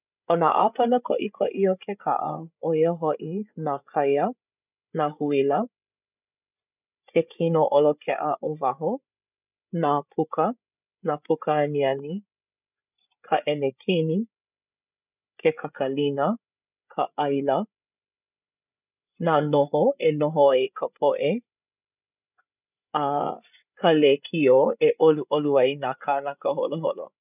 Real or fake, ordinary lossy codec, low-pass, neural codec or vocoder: fake; none; 3.6 kHz; codec, 16 kHz, 8 kbps, FreqCodec, larger model